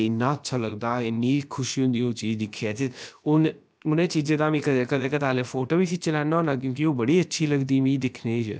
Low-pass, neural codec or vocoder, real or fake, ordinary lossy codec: none; codec, 16 kHz, about 1 kbps, DyCAST, with the encoder's durations; fake; none